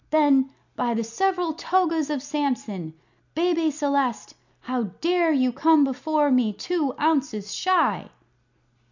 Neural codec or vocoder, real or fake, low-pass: none; real; 7.2 kHz